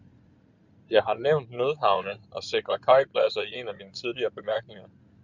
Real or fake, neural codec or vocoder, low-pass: fake; codec, 16 kHz in and 24 kHz out, 2.2 kbps, FireRedTTS-2 codec; 7.2 kHz